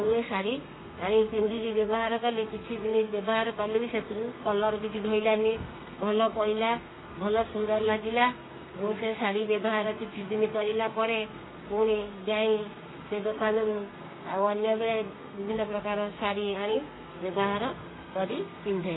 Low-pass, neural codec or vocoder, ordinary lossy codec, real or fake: 7.2 kHz; codec, 32 kHz, 1.9 kbps, SNAC; AAC, 16 kbps; fake